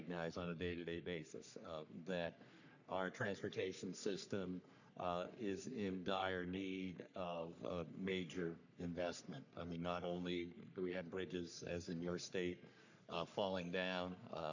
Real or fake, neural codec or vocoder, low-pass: fake; codec, 44.1 kHz, 3.4 kbps, Pupu-Codec; 7.2 kHz